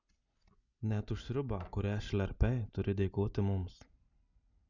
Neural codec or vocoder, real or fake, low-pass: none; real; 7.2 kHz